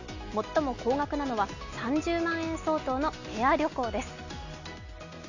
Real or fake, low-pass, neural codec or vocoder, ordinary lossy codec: real; 7.2 kHz; none; none